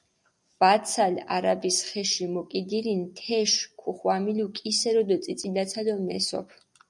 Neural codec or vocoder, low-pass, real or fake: none; 10.8 kHz; real